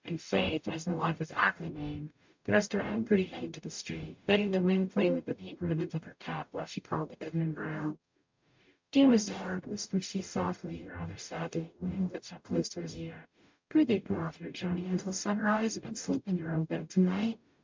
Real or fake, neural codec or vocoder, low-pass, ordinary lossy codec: fake; codec, 44.1 kHz, 0.9 kbps, DAC; 7.2 kHz; MP3, 64 kbps